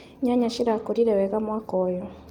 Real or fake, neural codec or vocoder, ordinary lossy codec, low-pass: real; none; Opus, 32 kbps; 19.8 kHz